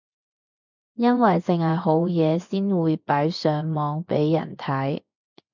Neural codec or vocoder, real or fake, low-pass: vocoder, 24 kHz, 100 mel bands, Vocos; fake; 7.2 kHz